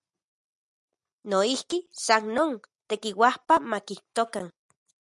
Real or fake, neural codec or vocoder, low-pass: real; none; 10.8 kHz